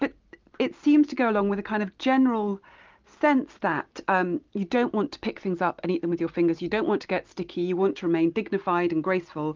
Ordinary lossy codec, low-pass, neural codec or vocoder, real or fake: Opus, 24 kbps; 7.2 kHz; none; real